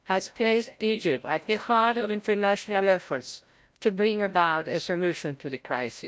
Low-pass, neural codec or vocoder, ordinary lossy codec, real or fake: none; codec, 16 kHz, 0.5 kbps, FreqCodec, larger model; none; fake